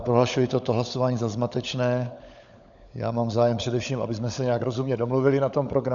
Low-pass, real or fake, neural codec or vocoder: 7.2 kHz; fake; codec, 16 kHz, 16 kbps, FunCodec, trained on LibriTTS, 50 frames a second